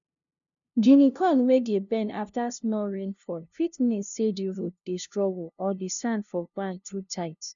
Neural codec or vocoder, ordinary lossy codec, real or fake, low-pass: codec, 16 kHz, 0.5 kbps, FunCodec, trained on LibriTTS, 25 frames a second; none; fake; 7.2 kHz